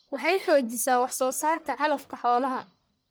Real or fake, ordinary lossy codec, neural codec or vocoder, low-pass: fake; none; codec, 44.1 kHz, 1.7 kbps, Pupu-Codec; none